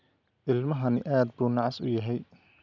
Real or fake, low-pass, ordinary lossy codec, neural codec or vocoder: real; 7.2 kHz; none; none